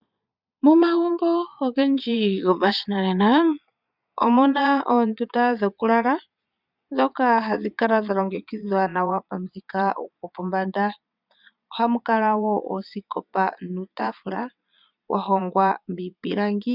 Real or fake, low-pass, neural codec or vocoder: fake; 5.4 kHz; vocoder, 22.05 kHz, 80 mel bands, Vocos